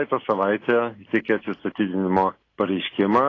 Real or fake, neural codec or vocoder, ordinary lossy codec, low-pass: real; none; AAC, 32 kbps; 7.2 kHz